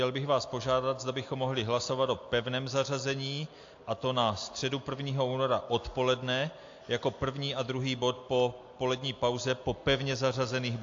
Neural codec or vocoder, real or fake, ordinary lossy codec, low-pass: none; real; AAC, 48 kbps; 7.2 kHz